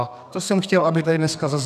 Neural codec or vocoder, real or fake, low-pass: codec, 32 kHz, 1.9 kbps, SNAC; fake; 14.4 kHz